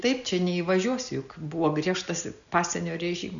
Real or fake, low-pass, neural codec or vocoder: real; 7.2 kHz; none